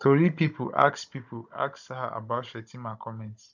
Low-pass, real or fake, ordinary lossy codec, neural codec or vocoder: 7.2 kHz; fake; none; codec, 16 kHz, 16 kbps, FunCodec, trained on Chinese and English, 50 frames a second